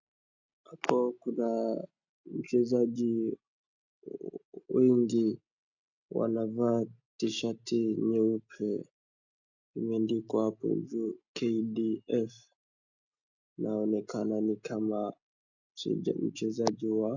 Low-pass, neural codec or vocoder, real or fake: 7.2 kHz; none; real